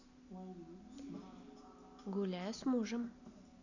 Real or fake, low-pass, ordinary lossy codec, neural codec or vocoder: real; 7.2 kHz; none; none